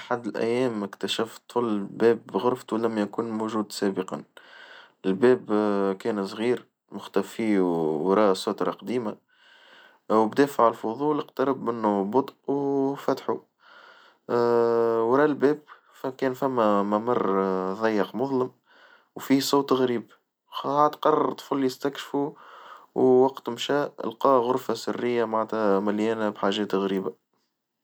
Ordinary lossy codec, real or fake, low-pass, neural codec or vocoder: none; real; none; none